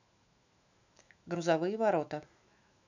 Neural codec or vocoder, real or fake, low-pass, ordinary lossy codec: autoencoder, 48 kHz, 128 numbers a frame, DAC-VAE, trained on Japanese speech; fake; 7.2 kHz; none